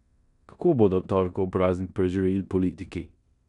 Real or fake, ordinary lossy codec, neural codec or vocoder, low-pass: fake; none; codec, 16 kHz in and 24 kHz out, 0.9 kbps, LongCat-Audio-Codec, four codebook decoder; 10.8 kHz